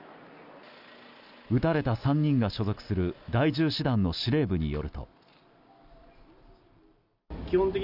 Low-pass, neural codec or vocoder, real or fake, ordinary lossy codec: 5.4 kHz; none; real; none